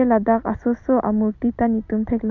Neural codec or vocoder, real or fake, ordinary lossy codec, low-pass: none; real; none; 7.2 kHz